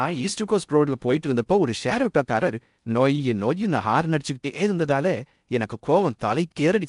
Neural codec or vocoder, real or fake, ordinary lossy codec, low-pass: codec, 16 kHz in and 24 kHz out, 0.6 kbps, FocalCodec, streaming, 4096 codes; fake; none; 10.8 kHz